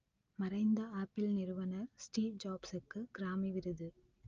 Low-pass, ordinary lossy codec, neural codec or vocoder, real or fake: 7.2 kHz; Opus, 24 kbps; none; real